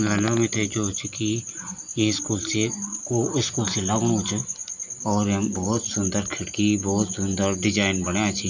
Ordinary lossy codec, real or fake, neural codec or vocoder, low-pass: none; real; none; 7.2 kHz